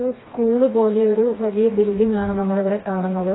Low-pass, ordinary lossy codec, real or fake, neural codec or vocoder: 7.2 kHz; AAC, 16 kbps; fake; codec, 16 kHz, 2 kbps, FreqCodec, smaller model